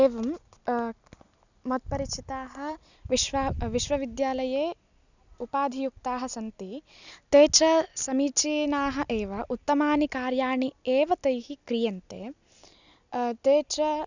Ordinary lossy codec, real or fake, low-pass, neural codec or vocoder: none; real; 7.2 kHz; none